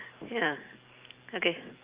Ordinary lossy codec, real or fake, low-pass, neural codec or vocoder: Opus, 24 kbps; real; 3.6 kHz; none